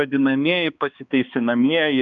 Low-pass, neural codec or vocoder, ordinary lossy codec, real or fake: 7.2 kHz; codec, 16 kHz, 2 kbps, X-Codec, HuBERT features, trained on balanced general audio; MP3, 96 kbps; fake